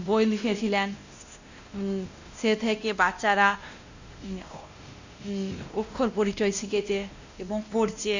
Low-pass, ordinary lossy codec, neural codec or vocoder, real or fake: 7.2 kHz; Opus, 64 kbps; codec, 16 kHz, 1 kbps, X-Codec, WavLM features, trained on Multilingual LibriSpeech; fake